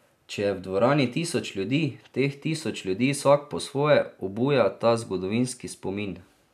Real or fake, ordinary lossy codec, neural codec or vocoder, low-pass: real; none; none; 14.4 kHz